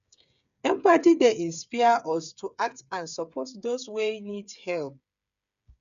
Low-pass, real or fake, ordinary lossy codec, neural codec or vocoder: 7.2 kHz; fake; none; codec, 16 kHz, 8 kbps, FreqCodec, smaller model